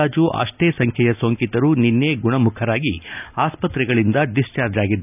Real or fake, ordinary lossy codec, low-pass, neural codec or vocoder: real; none; 3.6 kHz; none